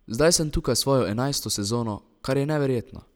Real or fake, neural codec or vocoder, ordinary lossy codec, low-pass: real; none; none; none